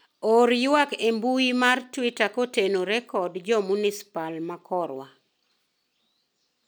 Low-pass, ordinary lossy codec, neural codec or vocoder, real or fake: none; none; none; real